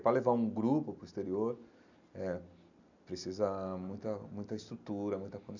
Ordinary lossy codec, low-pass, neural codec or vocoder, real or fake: none; 7.2 kHz; none; real